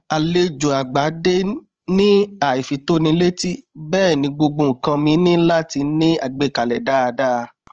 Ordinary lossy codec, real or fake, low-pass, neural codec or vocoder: Opus, 24 kbps; fake; 7.2 kHz; codec, 16 kHz, 16 kbps, FreqCodec, larger model